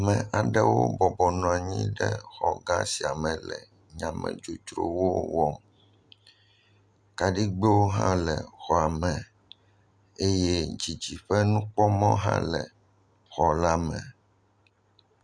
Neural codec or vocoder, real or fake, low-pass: none; real; 9.9 kHz